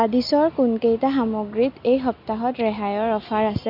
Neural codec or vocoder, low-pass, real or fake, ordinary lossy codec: none; 5.4 kHz; real; AAC, 48 kbps